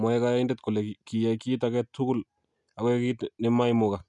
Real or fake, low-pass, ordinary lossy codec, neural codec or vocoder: real; 10.8 kHz; none; none